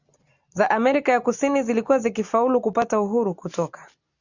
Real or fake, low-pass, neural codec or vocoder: real; 7.2 kHz; none